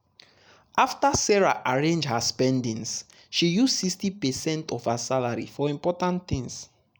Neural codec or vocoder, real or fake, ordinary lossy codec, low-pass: none; real; none; none